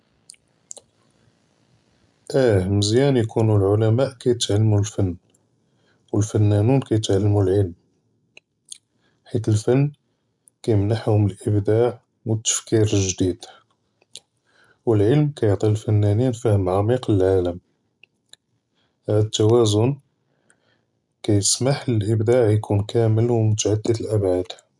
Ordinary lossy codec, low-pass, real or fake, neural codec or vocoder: none; 10.8 kHz; real; none